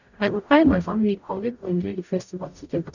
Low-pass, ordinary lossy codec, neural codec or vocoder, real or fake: 7.2 kHz; none; codec, 44.1 kHz, 0.9 kbps, DAC; fake